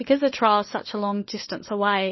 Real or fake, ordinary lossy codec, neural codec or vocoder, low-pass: fake; MP3, 24 kbps; codec, 16 kHz, 4 kbps, FunCodec, trained on LibriTTS, 50 frames a second; 7.2 kHz